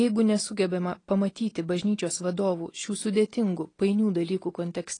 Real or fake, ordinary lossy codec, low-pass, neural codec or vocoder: real; AAC, 32 kbps; 9.9 kHz; none